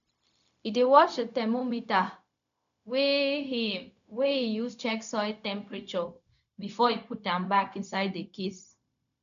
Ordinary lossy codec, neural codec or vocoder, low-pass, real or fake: none; codec, 16 kHz, 0.4 kbps, LongCat-Audio-Codec; 7.2 kHz; fake